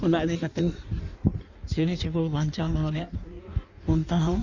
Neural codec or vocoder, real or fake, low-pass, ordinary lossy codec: codec, 16 kHz in and 24 kHz out, 1.1 kbps, FireRedTTS-2 codec; fake; 7.2 kHz; none